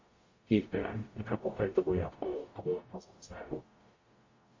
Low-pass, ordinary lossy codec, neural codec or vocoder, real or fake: 7.2 kHz; MP3, 32 kbps; codec, 44.1 kHz, 0.9 kbps, DAC; fake